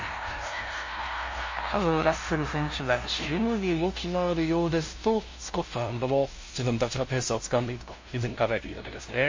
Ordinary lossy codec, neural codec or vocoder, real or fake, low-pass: MP3, 32 kbps; codec, 16 kHz, 0.5 kbps, FunCodec, trained on LibriTTS, 25 frames a second; fake; 7.2 kHz